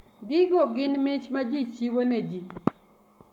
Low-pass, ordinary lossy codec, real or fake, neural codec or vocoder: 19.8 kHz; none; fake; codec, 44.1 kHz, 7.8 kbps, Pupu-Codec